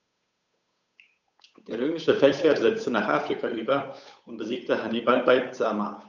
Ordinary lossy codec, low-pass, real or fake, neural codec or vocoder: none; 7.2 kHz; fake; codec, 16 kHz, 8 kbps, FunCodec, trained on Chinese and English, 25 frames a second